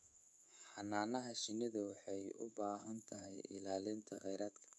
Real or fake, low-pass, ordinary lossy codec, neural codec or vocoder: fake; none; none; codec, 24 kHz, 3.1 kbps, DualCodec